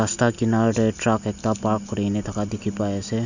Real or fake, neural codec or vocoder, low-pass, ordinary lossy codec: real; none; 7.2 kHz; none